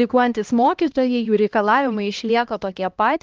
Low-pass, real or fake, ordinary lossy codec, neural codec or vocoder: 7.2 kHz; fake; Opus, 24 kbps; codec, 16 kHz, 1 kbps, X-Codec, HuBERT features, trained on LibriSpeech